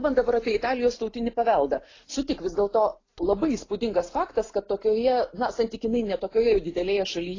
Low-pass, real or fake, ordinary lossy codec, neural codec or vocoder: 7.2 kHz; real; AAC, 32 kbps; none